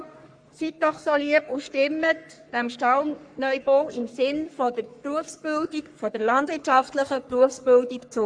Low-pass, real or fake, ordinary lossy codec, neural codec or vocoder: 9.9 kHz; fake; AAC, 64 kbps; codec, 44.1 kHz, 3.4 kbps, Pupu-Codec